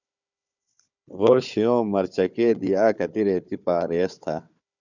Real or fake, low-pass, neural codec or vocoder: fake; 7.2 kHz; codec, 16 kHz, 4 kbps, FunCodec, trained on Chinese and English, 50 frames a second